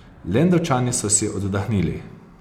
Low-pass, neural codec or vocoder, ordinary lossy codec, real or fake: 19.8 kHz; none; none; real